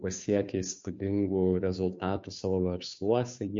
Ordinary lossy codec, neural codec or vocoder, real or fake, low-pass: MP3, 48 kbps; codec, 16 kHz, 2 kbps, FunCodec, trained on Chinese and English, 25 frames a second; fake; 7.2 kHz